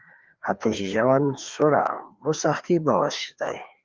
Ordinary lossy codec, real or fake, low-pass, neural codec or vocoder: Opus, 32 kbps; fake; 7.2 kHz; codec, 16 kHz, 2 kbps, FreqCodec, larger model